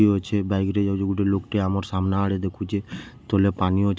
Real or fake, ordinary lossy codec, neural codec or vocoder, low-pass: real; none; none; none